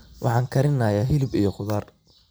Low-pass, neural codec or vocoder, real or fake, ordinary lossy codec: none; none; real; none